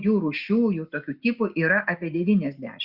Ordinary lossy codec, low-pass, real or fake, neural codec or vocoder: Opus, 64 kbps; 5.4 kHz; real; none